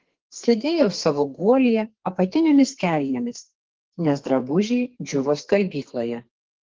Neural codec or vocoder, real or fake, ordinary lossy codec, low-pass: codec, 32 kHz, 1.9 kbps, SNAC; fake; Opus, 16 kbps; 7.2 kHz